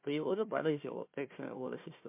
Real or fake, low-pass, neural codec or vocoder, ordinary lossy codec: fake; 3.6 kHz; codec, 16 kHz, 1 kbps, FunCodec, trained on Chinese and English, 50 frames a second; MP3, 24 kbps